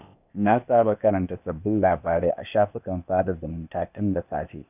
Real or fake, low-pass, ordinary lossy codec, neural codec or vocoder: fake; 3.6 kHz; none; codec, 16 kHz, 0.8 kbps, ZipCodec